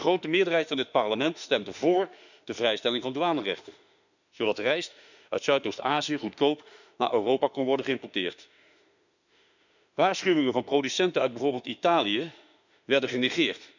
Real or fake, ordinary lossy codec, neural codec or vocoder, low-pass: fake; none; autoencoder, 48 kHz, 32 numbers a frame, DAC-VAE, trained on Japanese speech; 7.2 kHz